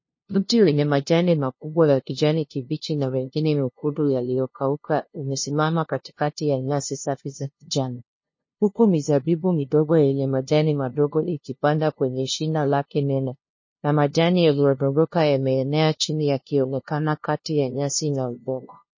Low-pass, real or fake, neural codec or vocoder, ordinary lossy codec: 7.2 kHz; fake; codec, 16 kHz, 0.5 kbps, FunCodec, trained on LibriTTS, 25 frames a second; MP3, 32 kbps